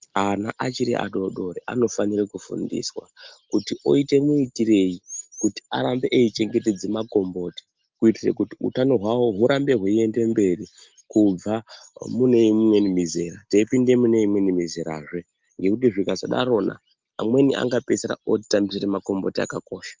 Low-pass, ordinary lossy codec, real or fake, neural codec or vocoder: 7.2 kHz; Opus, 32 kbps; real; none